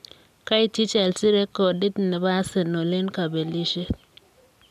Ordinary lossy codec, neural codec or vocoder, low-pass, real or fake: AAC, 96 kbps; none; 14.4 kHz; real